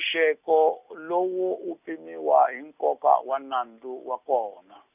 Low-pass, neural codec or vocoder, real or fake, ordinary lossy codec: 3.6 kHz; none; real; none